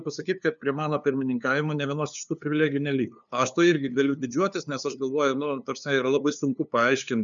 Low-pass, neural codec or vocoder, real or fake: 7.2 kHz; codec, 16 kHz, 2 kbps, FunCodec, trained on LibriTTS, 25 frames a second; fake